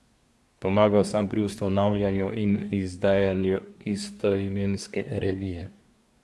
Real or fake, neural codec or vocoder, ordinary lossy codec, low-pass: fake; codec, 24 kHz, 1 kbps, SNAC; none; none